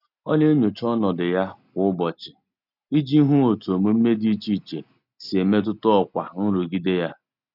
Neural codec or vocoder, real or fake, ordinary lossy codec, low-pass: none; real; none; 5.4 kHz